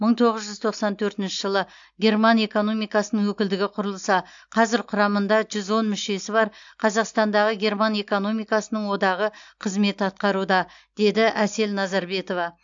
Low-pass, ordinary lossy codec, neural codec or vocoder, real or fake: 7.2 kHz; AAC, 64 kbps; none; real